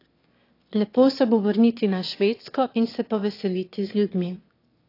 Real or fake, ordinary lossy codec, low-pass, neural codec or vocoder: fake; AAC, 32 kbps; 5.4 kHz; autoencoder, 22.05 kHz, a latent of 192 numbers a frame, VITS, trained on one speaker